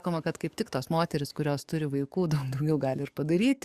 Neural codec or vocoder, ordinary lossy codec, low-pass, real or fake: codec, 44.1 kHz, 7.8 kbps, DAC; Opus, 64 kbps; 14.4 kHz; fake